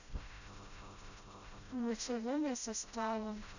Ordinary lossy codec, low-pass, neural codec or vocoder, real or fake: none; 7.2 kHz; codec, 16 kHz, 0.5 kbps, FreqCodec, smaller model; fake